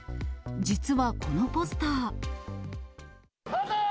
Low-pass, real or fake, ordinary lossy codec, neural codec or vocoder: none; real; none; none